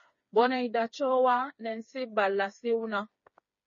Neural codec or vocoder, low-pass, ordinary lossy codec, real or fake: codec, 16 kHz, 4 kbps, FreqCodec, smaller model; 7.2 kHz; MP3, 32 kbps; fake